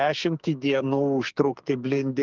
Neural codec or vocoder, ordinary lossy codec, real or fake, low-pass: codec, 44.1 kHz, 2.6 kbps, SNAC; Opus, 32 kbps; fake; 7.2 kHz